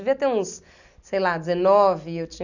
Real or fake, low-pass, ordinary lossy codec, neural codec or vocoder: real; 7.2 kHz; none; none